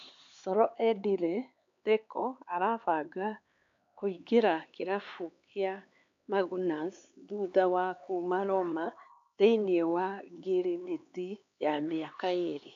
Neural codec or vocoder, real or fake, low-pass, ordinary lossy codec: codec, 16 kHz, 4 kbps, X-Codec, HuBERT features, trained on LibriSpeech; fake; 7.2 kHz; AAC, 64 kbps